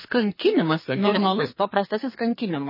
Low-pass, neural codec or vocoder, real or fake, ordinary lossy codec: 5.4 kHz; codec, 32 kHz, 1.9 kbps, SNAC; fake; MP3, 24 kbps